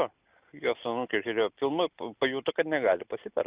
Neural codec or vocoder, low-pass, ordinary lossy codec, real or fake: none; 3.6 kHz; Opus, 64 kbps; real